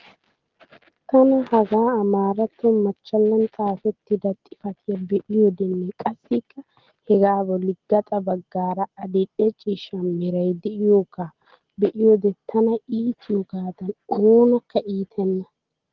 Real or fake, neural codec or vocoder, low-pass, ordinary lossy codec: real; none; 7.2 kHz; Opus, 16 kbps